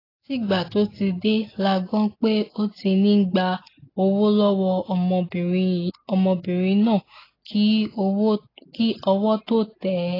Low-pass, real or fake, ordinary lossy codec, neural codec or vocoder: 5.4 kHz; real; AAC, 24 kbps; none